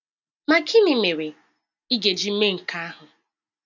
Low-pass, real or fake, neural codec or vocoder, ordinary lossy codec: 7.2 kHz; real; none; none